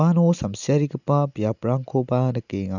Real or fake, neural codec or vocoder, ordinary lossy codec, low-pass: real; none; none; 7.2 kHz